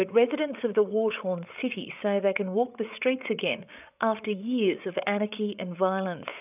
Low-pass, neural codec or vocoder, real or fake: 3.6 kHz; codec, 16 kHz, 8 kbps, FreqCodec, larger model; fake